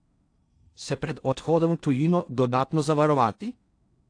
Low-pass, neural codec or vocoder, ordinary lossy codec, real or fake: 9.9 kHz; codec, 16 kHz in and 24 kHz out, 0.6 kbps, FocalCodec, streaming, 4096 codes; AAC, 48 kbps; fake